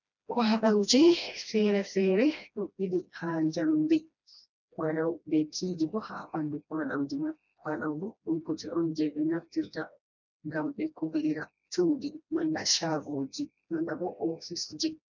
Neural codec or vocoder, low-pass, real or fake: codec, 16 kHz, 1 kbps, FreqCodec, smaller model; 7.2 kHz; fake